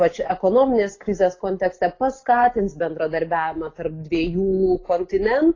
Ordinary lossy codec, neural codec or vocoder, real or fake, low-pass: AAC, 32 kbps; none; real; 7.2 kHz